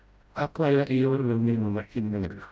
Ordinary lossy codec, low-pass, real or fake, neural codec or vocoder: none; none; fake; codec, 16 kHz, 0.5 kbps, FreqCodec, smaller model